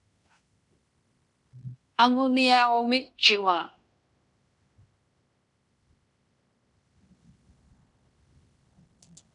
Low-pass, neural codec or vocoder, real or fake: 10.8 kHz; codec, 16 kHz in and 24 kHz out, 0.9 kbps, LongCat-Audio-Codec, fine tuned four codebook decoder; fake